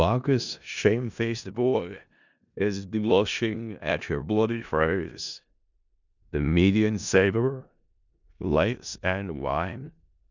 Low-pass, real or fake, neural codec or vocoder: 7.2 kHz; fake; codec, 16 kHz in and 24 kHz out, 0.4 kbps, LongCat-Audio-Codec, four codebook decoder